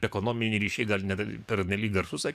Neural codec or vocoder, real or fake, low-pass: codec, 44.1 kHz, 7.8 kbps, DAC; fake; 14.4 kHz